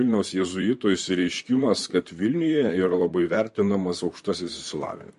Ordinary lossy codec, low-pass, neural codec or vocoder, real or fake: MP3, 48 kbps; 14.4 kHz; vocoder, 44.1 kHz, 128 mel bands, Pupu-Vocoder; fake